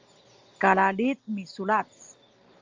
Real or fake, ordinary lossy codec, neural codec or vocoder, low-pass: real; Opus, 32 kbps; none; 7.2 kHz